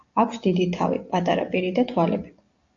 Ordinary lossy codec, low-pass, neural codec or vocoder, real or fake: AAC, 48 kbps; 7.2 kHz; none; real